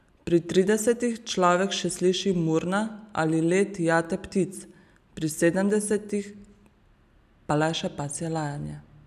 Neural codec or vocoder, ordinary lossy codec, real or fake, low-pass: none; none; real; 14.4 kHz